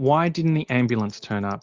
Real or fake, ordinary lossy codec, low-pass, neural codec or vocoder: real; Opus, 24 kbps; 7.2 kHz; none